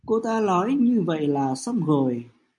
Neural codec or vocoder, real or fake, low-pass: none; real; 10.8 kHz